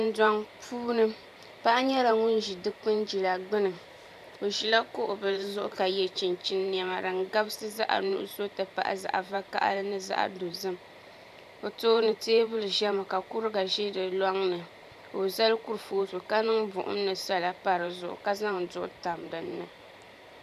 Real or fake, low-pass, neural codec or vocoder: fake; 14.4 kHz; vocoder, 44.1 kHz, 128 mel bands every 512 samples, BigVGAN v2